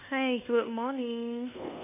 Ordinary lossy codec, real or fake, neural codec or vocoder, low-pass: none; fake; codec, 24 kHz, 1.2 kbps, DualCodec; 3.6 kHz